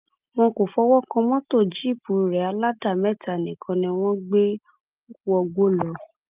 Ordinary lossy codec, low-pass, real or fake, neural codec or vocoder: Opus, 32 kbps; 3.6 kHz; real; none